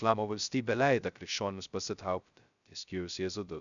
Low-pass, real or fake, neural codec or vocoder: 7.2 kHz; fake; codec, 16 kHz, 0.2 kbps, FocalCodec